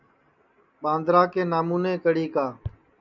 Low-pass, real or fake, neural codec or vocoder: 7.2 kHz; real; none